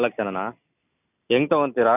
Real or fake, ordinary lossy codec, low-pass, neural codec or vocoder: real; none; 3.6 kHz; none